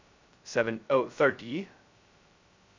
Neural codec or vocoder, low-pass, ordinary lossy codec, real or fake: codec, 16 kHz, 0.2 kbps, FocalCodec; 7.2 kHz; MP3, 64 kbps; fake